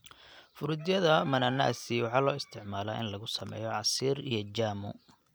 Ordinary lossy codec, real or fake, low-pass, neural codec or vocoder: none; real; none; none